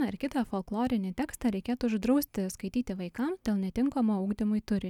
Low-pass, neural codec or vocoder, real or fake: 19.8 kHz; none; real